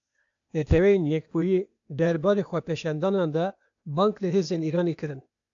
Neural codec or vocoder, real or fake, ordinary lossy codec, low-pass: codec, 16 kHz, 0.8 kbps, ZipCodec; fake; AAC, 64 kbps; 7.2 kHz